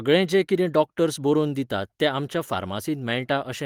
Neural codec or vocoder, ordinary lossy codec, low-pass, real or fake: none; Opus, 24 kbps; 19.8 kHz; real